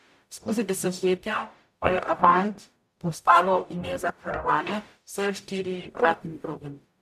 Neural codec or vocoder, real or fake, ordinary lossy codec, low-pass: codec, 44.1 kHz, 0.9 kbps, DAC; fake; none; 14.4 kHz